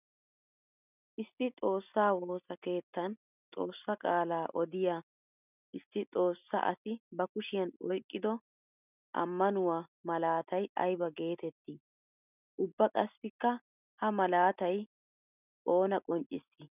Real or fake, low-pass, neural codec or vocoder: real; 3.6 kHz; none